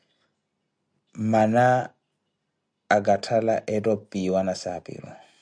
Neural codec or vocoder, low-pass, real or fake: none; 9.9 kHz; real